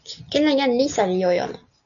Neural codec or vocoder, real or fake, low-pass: none; real; 7.2 kHz